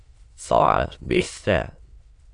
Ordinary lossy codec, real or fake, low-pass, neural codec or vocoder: AAC, 48 kbps; fake; 9.9 kHz; autoencoder, 22.05 kHz, a latent of 192 numbers a frame, VITS, trained on many speakers